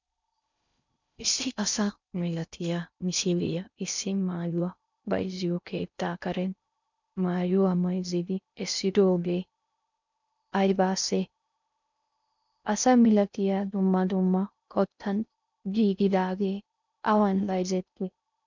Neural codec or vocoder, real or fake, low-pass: codec, 16 kHz in and 24 kHz out, 0.6 kbps, FocalCodec, streaming, 4096 codes; fake; 7.2 kHz